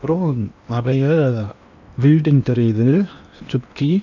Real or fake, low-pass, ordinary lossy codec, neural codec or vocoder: fake; 7.2 kHz; none; codec, 16 kHz in and 24 kHz out, 0.8 kbps, FocalCodec, streaming, 65536 codes